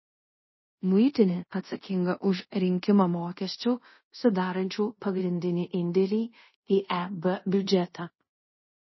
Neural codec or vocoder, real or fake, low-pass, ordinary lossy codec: codec, 24 kHz, 0.5 kbps, DualCodec; fake; 7.2 kHz; MP3, 24 kbps